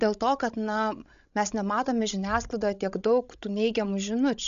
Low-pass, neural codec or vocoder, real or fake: 7.2 kHz; codec, 16 kHz, 16 kbps, FreqCodec, larger model; fake